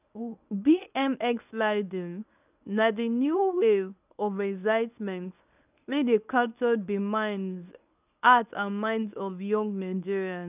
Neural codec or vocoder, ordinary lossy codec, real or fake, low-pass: codec, 24 kHz, 0.9 kbps, WavTokenizer, medium speech release version 1; none; fake; 3.6 kHz